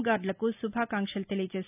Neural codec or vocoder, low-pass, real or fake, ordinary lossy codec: none; 3.6 kHz; real; none